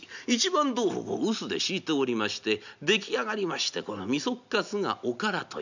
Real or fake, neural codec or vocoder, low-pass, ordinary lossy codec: real; none; 7.2 kHz; none